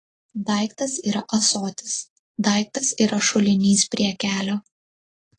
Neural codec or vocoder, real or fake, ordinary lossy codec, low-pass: none; real; AAC, 32 kbps; 10.8 kHz